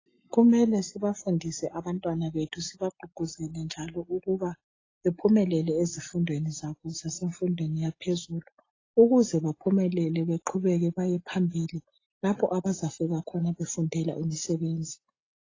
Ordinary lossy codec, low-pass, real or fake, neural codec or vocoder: AAC, 32 kbps; 7.2 kHz; real; none